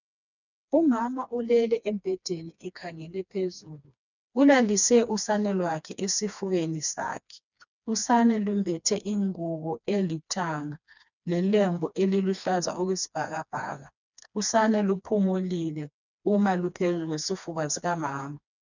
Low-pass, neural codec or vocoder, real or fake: 7.2 kHz; codec, 16 kHz, 2 kbps, FreqCodec, smaller model; fake